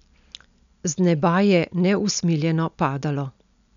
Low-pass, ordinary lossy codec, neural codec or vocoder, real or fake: 7.2 kHz; none; none; real